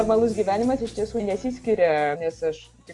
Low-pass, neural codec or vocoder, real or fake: 10.8 kHz; none; real